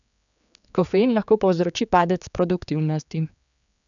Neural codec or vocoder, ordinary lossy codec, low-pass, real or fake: codec, 16 kHz, 4 kbps, X-Codec, HuBERT features, trained on general audio; none; 7.2 kHz; fake